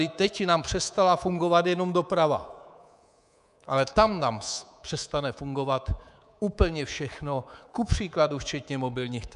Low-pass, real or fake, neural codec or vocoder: 10.8 kHz; fake; codec, 24 kHz, 3.1 kbps, DualCodec